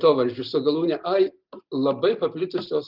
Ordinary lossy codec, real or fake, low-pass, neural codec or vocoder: Opus, 24 kbps; real; 5.4 kHz; none